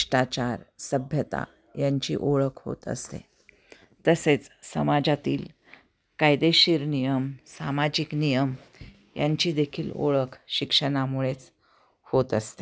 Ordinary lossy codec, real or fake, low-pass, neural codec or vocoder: none; real; none; none